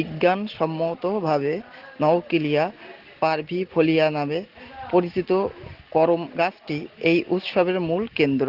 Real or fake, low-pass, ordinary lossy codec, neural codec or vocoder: real; 5.4 kHz; Opus, 16 kbps; none